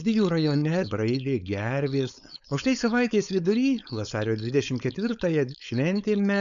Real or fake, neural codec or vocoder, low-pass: fake; codec, 16 kHz, 4.8 kbps, FACodec; 7.2 kHz